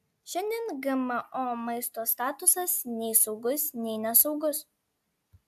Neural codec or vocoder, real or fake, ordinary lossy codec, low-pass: none; real; AAC, 96 kbps; 14.4 kHz